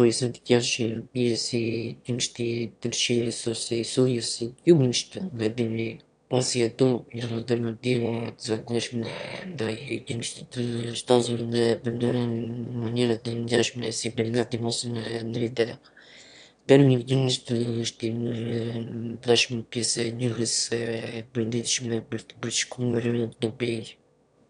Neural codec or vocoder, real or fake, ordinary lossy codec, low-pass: autoencoder, 22.05 kHz, a latent of 192 numbers a frame, VITS, trained on one speaker; fake; none; 9.9 kHz